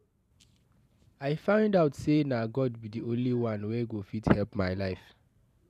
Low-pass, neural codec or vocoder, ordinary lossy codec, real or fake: 14.4 kHz; vocoder, 44.1 kHz, 128 mel bands every 512 samples, BigVGAN v2; none; fake